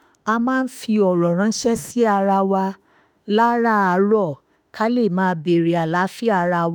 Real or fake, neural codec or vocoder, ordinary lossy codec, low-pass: fake; autoencoder, 48 kHz, 32 numbers a frame, DAC-VAE, trained on Japanese speech; none; none